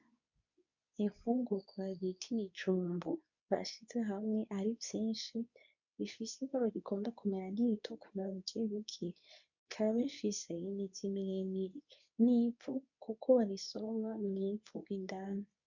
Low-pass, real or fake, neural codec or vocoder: 7.2 kHz; fake; codec, 24 kHz, 0.9 kbps, WavTokenizer, medium speech release version 2